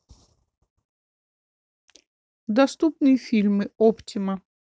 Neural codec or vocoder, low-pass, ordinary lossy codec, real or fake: none; none; none; real